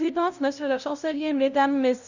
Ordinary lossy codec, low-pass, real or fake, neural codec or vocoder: none; 7.2 kHz; fake; codec, 16 kHz, 0.5 kbps, FunCodec, trained on LibriTTS, 25 frames a second